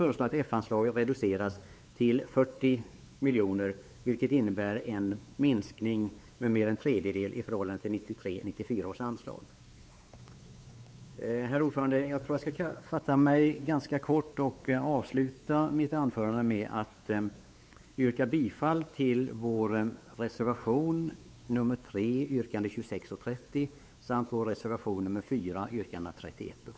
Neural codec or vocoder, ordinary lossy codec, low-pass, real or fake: codec, 16 kHz, 4 kbps, X-Codec, WavLM features, trained on Multilingual LibriSpeech; none; none; fake